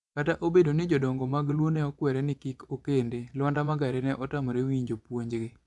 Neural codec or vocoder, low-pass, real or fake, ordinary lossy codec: vocoder, 24 kHz, 100 mel bands, Vocos; 10.8 kHz; fake; none